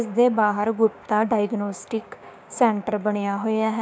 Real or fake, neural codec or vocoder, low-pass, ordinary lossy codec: fake; codec, 16 kHz, 6 kbps, DAC; none; none